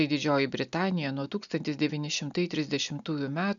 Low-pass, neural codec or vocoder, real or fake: 7.2 kHz; none; real